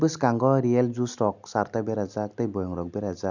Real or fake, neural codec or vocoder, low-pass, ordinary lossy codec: real; none; 7.2 kHz; none